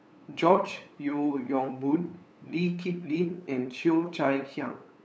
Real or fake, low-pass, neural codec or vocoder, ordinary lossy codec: fake; none; codec, 16 kHz, 8 kbps, FunCodec, trained on LibriTTS, 25 frames a second; none